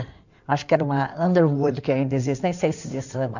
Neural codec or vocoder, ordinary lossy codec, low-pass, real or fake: codec, 16 kHz in and 24 kHz out, 2.2 kbps, FireRedTTS-2 codec; none; 7.2 kHz; fake